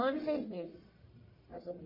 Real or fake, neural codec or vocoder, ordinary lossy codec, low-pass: fake; codec, 44.1 kHz, 1.7 kbps, Pupu-Codec; MP3, 24 kbps; 5.4 kHz